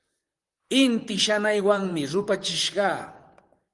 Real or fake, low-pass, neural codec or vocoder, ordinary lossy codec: fake; 10.8 kHz; vocoder, 44.1 kHz, 128 mel bands, Pupu-Vocoder; Opus, 24 kbps